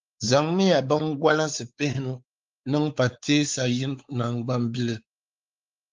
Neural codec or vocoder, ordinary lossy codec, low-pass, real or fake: codec, 16 kHz, 4 kbps, X-Codec, WavLM features, trained on Multilingual LibriSpeech; Opus, 32 kbps; 7.2 kHz; fake